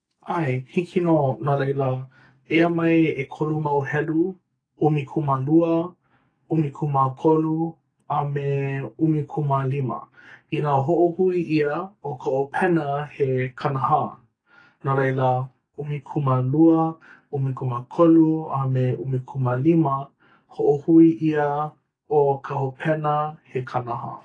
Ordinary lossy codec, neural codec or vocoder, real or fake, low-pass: AAC, 32 kbps; codec, 44.1 kHz, 7.8 kbps, DAC; fake; 9.9 kHz